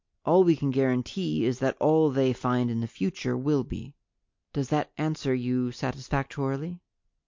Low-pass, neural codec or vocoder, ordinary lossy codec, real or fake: 7.2 kHz; none; MP3, 48 kbps; real